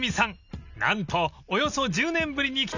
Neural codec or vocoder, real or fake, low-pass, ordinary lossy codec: none; real; 7.2 kHz; none